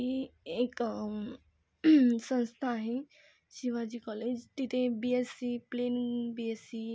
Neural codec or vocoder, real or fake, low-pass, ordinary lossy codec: none; real; none; none